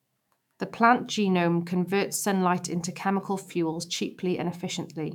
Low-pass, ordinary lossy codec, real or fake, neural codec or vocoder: 19.8 kHz; none; fake; autoencoder, 48 kHz, 128 numbers a frame, DAC-VAE, trained on Japanese speech